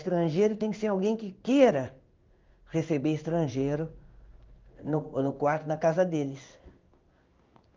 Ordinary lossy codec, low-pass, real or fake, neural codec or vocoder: Opus, 24 kbps; 7.2 kHz; fake; codec, 16 kHz in and 24 kHz out, 1 kbps, XY-Tokenizer